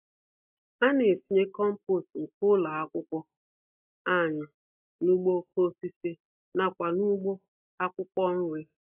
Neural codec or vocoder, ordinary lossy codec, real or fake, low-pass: none; AAC, 32 kbps; real; 3.6 kHz